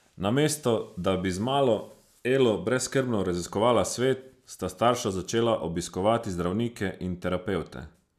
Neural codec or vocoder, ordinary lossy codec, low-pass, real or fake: none; none; 14.4 kHz; real